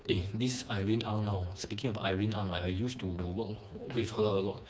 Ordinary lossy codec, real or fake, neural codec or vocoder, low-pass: none; fake; codec, 16 kHz, 2 kbps, FreqCodec, smaller model; none